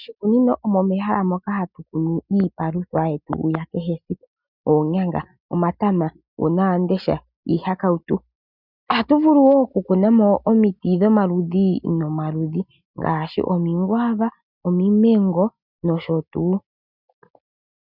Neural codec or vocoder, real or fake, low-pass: none; real; 5.4 kHz